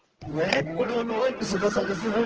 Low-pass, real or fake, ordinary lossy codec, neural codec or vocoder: 7.2 kHz; fake; Opus, 16 kbps; codec, 24 kHz, 0.9 kbps, WavTokenizer, medium speech release version 1